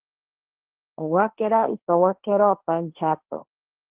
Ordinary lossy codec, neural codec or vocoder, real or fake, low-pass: Opus, 16 kbps; codec, 16 kHz, 1.1 kbps, Voila-Tokenizer; fake; 3.6 kHz